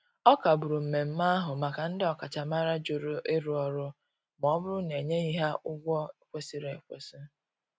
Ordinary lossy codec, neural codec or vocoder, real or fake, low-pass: none; none; real; none